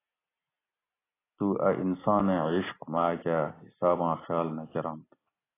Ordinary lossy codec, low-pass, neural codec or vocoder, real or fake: AAC, 16 kbps; 3.6 kHz; none; real